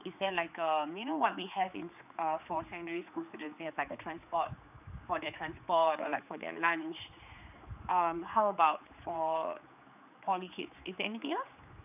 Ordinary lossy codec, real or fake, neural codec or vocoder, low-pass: none; fake; codec, 16 kHz, 4 kbps, X-Codec, HuBERT features, trained on general audio; 3.6 kHz